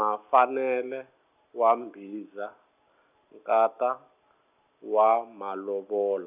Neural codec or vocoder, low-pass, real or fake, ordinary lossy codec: none; 3.6 kHz; real; none